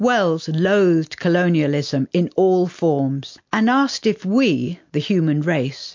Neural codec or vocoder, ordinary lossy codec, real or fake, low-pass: none; MP3, 48 kbps; real; 7.2 kHz